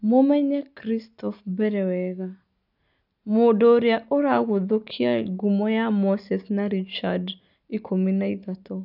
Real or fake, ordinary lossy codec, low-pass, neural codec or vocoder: real; none; 5.4 kHz; none